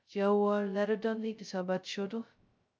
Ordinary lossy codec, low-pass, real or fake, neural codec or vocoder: none; none; fake; codec, 16 kHz, 0.2 kbps, FocalCodec